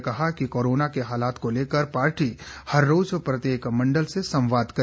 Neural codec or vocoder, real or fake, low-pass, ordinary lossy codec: none; real; none; none